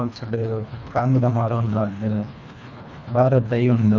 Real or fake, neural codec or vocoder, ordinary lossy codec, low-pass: fake; codec, 24 kHz, 1.5 kbps, HILCodec; none; 7.2 kHz